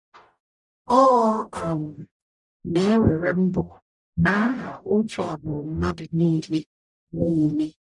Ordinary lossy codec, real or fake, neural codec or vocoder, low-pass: none; fake; codec, 44.1 kHz, 0.9 kbps, DAC; 10.8 kHz